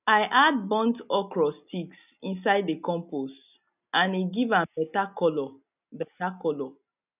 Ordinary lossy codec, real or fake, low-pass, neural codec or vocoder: none; real; 3.6 kHz; none